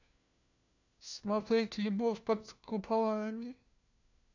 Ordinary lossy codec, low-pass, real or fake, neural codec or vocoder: none; 7.2 kHz; fake; codec, 16 kHz, 1 kbps, FunCodec, trained on LibriTTS, 50 frames a second